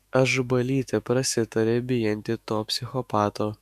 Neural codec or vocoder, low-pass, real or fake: autoencoder, 48 kHz, 128 numbers a frame, DAC-VAE, trained on Japanese speech; 14.4 kHz; fake